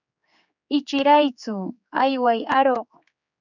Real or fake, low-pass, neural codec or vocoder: fake; 7.2 kHz; codec, 16 kHz, 4 kbps, X-Codec, HuBERT features, trained on general audio